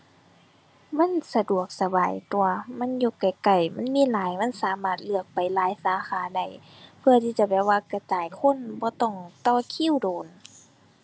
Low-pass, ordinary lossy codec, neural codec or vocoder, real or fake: none; none; none; real